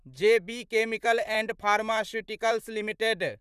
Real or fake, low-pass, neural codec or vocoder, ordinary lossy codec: fake; 14.4 kHz; vocoder, 44.1 kHz, 128 mel bands, Pupu-Vocoder; none